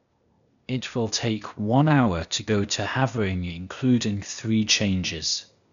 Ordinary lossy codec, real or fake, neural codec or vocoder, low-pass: none; fake; codec, 16 kHz, 0.8 kbps, ZipCodec; 7.2 kHz